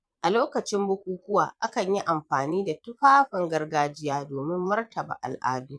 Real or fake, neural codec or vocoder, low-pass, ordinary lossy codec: fake; vocoder, 22.05 kHz, 80 mel bands, Vocos; none; none